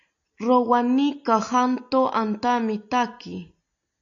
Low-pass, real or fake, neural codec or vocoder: 7.2 kHz; real; none